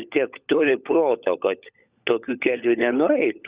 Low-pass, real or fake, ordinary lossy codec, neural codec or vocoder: 3.6 kHz; fake; Opus, 64 kbps; codec, 16 kHz, 16 kbps, FunCodec, trained on LibriTTS, 50 frames a second